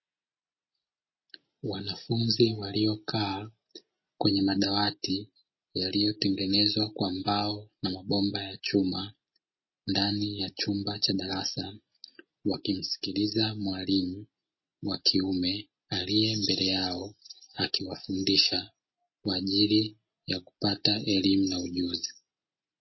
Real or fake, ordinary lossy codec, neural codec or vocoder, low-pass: real; MP3, 24 kbps; none; 7.2 kHz